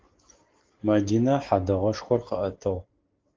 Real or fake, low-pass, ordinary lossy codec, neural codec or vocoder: real; 7.2 kHz; Opus, 16 kbps; none